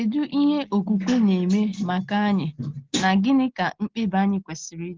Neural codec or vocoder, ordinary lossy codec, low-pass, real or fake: none; Opus, 16 kbps; 7.2 kHz; real